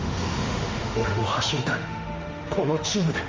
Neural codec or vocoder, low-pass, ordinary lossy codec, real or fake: autoencoder, 48 kHz, 32 numbers a frame, DAC-VAE, trained on Japanese speech; 7.2 kHz; Opus, 32 kbps; fake